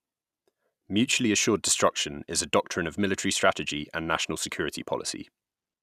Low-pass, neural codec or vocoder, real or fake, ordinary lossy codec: 14.4 kHz; none; real; none